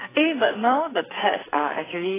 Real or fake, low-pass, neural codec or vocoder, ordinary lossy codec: fake; 3.6 kHz; codec, 44.1 kHz, 2.6 kbps, SNAC; AAC, 16 kbps